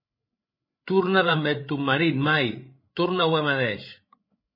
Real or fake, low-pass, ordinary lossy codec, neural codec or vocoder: fake; 5.4 kHz; MP3, 24 kbps; codec, 16 kHz, 16 kbps, FreqCodec, larger model